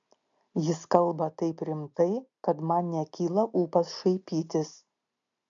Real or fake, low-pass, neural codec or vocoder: real; 7.2 kHz; none